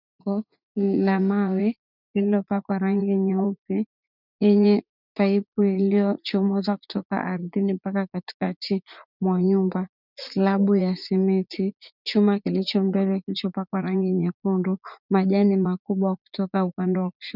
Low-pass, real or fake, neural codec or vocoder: 5.4 kHz; fake; vocoder, 44.1 kHz, 80 mel bands, Vocos